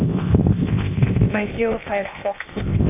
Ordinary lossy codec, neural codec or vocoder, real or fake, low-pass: AAC, 32 kbps; codec, 16 kHz, 0.8 kbps, ZipCodec; fake; 3.6 kHz